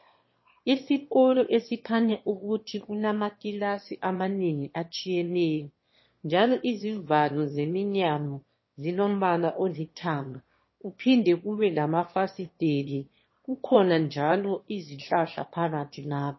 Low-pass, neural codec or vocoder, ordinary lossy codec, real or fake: 7.2 kHz; autoencoder, 22.05 kHz, a latent of 192 numbers a frame, VITS, trained on one speaker; MP3, 24 kbps; fake